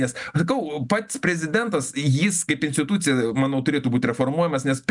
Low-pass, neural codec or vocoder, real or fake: 10.8 kHz; none; real